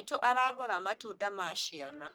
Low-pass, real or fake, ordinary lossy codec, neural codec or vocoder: none; fake; none; codec, 44.1 kHz, 1.7 kbps, Pupu-Codec